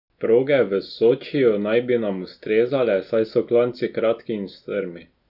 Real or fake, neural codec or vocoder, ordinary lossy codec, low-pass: real; none; none; 5.4 kHz